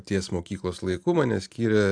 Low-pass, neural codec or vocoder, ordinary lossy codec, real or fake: 9.9 kHz; none; MP3, 96 kbps; real